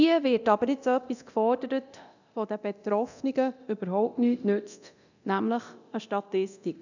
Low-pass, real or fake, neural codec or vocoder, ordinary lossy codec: 7.2 kHz; fake; codec, 24 kHz, 0.9 kbps, DualCodec; none